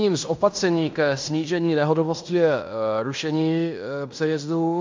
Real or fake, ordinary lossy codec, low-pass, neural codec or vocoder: fake; MP3, 64 kbps; 7.2 kHz; codec, 16 kHz in and 24 kHz out, 0.9 kbps, LongCat-Audio-Codec, fine tuned four codebook decoder